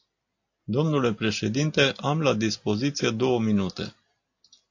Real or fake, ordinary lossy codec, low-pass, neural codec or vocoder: real; AAC, 48 kbps; 7.2 kHz; none